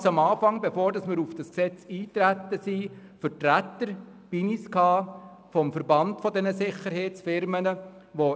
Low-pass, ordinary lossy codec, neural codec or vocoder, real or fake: none; none; none; real